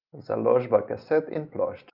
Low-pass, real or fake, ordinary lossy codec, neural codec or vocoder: 5.4 kHz; real; Opus, 32 kbps; none